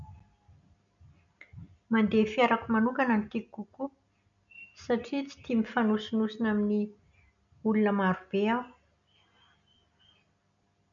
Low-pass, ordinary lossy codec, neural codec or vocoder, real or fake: 7.2 kHz; none; none; real